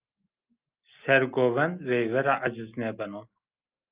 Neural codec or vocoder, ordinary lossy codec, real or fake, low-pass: none; Opus, 32 kbps; real; 3.6 kHz